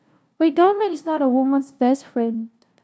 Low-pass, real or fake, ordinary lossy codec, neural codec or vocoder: none; fake; none; codec, 16 kHz, 0.5 kbps, FunCodec, trained on LibriTTS, 25 frames a second